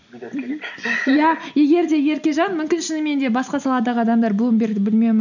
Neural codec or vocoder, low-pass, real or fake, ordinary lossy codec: none; 7.2 kHz; real; none